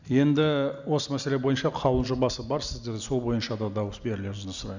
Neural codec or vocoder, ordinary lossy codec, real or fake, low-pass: none; none; real; 7.2 kHz